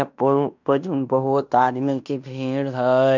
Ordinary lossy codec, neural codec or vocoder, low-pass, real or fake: none; codec, 16 kHz in and 24 kHz out, 0.9 kbps, LongCat-Audio-Codec, fine tuned four codebook decoder; 7.2 kHz; fake